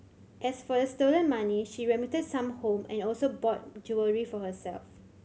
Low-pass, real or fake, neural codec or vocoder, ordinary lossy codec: none; real; none; none